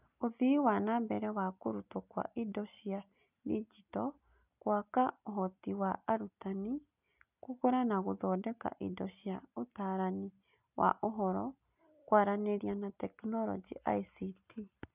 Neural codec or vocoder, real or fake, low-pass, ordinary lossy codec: none; real; 3.6 kHz; none